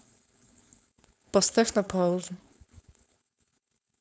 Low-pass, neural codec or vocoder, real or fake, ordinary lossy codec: none; codec, 16 kHz, 4.8 kbps, FACodec; fake; none